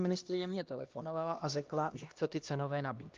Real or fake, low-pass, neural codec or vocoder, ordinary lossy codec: fake; 7.2 kHz; codec, 16 kHz, 1 kbps, X-Codec, HuBERT features, trained on LibriSpeech; Opus, 24 kbps